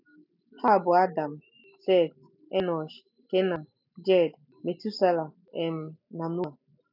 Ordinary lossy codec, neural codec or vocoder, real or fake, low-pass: none; none; real; 5.4 kHz